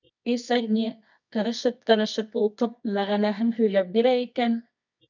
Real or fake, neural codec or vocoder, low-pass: fake; codec, 24 kHz, 0.9 kbps, WavTokenizer, medium music audio release; 7.2 kHz